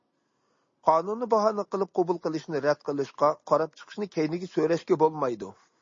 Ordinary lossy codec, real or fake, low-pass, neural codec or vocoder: MP3, 32 kbps; real; 7.2 kHz; none